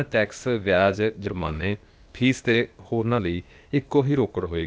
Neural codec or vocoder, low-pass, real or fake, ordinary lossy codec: codec, 16 kHz, 0.8 kbps, ZipCodec; none; fake; none